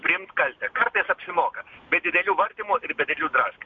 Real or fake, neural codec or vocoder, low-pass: real; none; 7.2 kHz